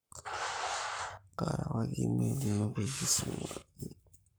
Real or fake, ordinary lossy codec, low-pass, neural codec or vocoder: fake; none; none; codec, 44.1 kHz, 7.8 kbps, Pupu-Codec